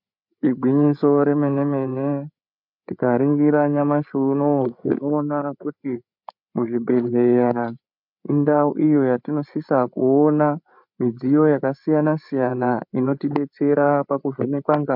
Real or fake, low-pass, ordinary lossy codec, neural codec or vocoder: fake; 5.4 kHz; MP3, 48 kbps; codec, 16 kHz, 8 kbps, FreqCodec, larger model